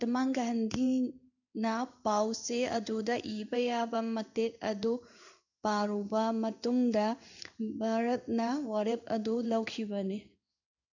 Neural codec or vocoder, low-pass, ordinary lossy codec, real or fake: codec, 16 kHz in and 24 kHz out, 1 kbps, XY-Tokenizer; 7.2 kHz; none; fake